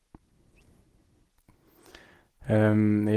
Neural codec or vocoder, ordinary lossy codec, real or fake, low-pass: none; Opus, 16 kbps; real; 14.4 kHz